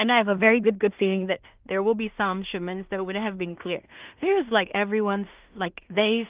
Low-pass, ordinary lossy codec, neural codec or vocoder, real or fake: 3.6 kHz; Opus, 32 kbps; codec, 16 kHz in and 24 kHz out, 0.4 kbps, LongCat-Audio-Codec, two codebook decoder; fake